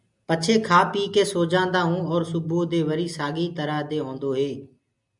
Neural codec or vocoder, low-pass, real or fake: none; 10.8 kHz; real